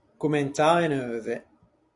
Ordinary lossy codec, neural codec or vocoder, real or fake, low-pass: AAC, 64 kbps; vocoder, 44.1 kHz, 128 mel bands every 256 samples, BigVGAN v2; fake; 10.8 kHz